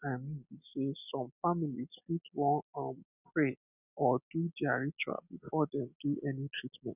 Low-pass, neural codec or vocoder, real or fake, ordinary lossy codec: 3.6 kHz; none; real; none